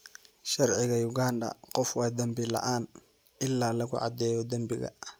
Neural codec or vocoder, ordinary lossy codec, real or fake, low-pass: none; none; real; none